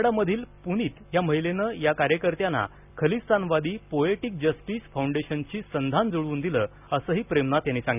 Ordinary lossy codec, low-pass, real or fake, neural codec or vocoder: none; 3.6 kHz; real; none